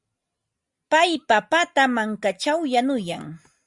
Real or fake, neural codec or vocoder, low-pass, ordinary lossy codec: real; none; 10.8 kHz; Opus, 64 kbps